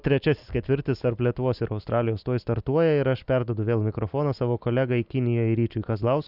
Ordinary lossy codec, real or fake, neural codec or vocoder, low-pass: Opus, 64 kbps; real; none; 5.4 kHz